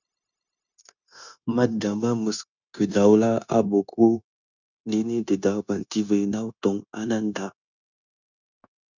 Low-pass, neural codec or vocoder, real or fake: 7.2 kHz; codec, 16 kHz, 0.9 kbps, LongCat-Audio-Codec; fake